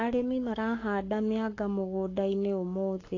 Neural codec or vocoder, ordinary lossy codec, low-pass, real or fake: codec, 44.1 kHz, 7.8 kbps, Pupu-Codec; AAC, 32 kbps; 7.2 kHz; fake